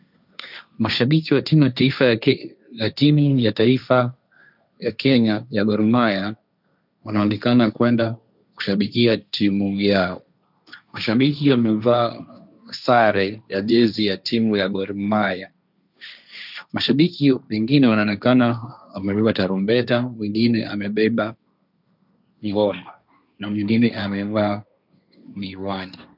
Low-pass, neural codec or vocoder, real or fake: 5.4 kHz; codec, 16 kHz, 1.1 kbps, Voila-Tokenizer; fake